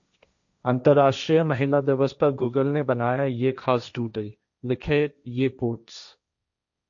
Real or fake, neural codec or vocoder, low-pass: fake; codec, 16 kHz, 1.1 kbps, Voila-Tokenizer; 7.2 kHz